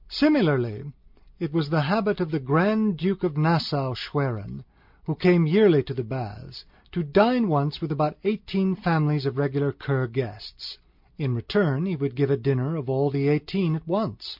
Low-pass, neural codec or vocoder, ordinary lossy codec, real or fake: 5.4 kHz; none; MP3, 48 kbps; real